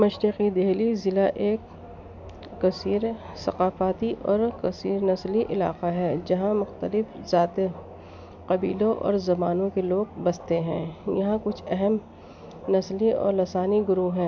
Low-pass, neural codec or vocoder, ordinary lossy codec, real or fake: 7.2 kHz; none; none; real